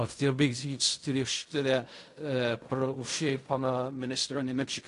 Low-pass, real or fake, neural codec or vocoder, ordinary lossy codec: 10.8 kHz; fake; codec, 16 kHz in and 24 kHz out, 0.4 kbps, LongCat-Audio-Codec, fine tuned four codebook decoder; AAC, 48 kbps